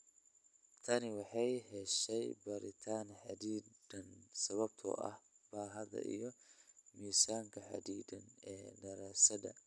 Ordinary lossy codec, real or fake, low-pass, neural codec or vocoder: none; real; none; none